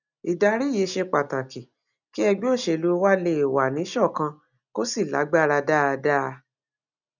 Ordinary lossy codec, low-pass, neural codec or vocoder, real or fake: none; 7.2 kHz; none; real